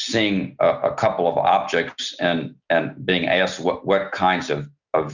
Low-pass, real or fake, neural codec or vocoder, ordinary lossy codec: 7.2 kHz; real; none; Opus, 64 kbps